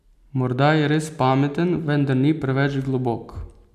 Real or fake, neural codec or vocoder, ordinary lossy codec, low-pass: real; none; none; 14.4 kHz